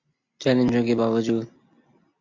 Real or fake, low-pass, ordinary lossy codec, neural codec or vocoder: real; 7.2 kHz; MP3, 64 kbps; none